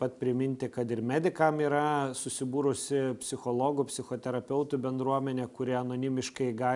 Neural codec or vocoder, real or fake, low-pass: none; real; 10.8 kHz